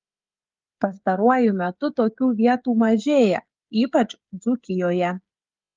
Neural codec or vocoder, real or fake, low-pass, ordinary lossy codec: codec, 16 kHz, 16 kbps, FreqCodec, smaller model; fake; 7.2 kHz; Opus, 32 kbps